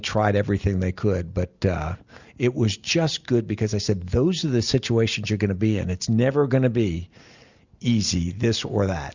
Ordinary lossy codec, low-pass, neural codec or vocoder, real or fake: Opus, 64 kbps; 7.2 kHz; none; real